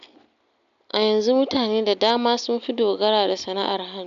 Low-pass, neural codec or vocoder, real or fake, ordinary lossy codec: 7.2 kHz; none; real; none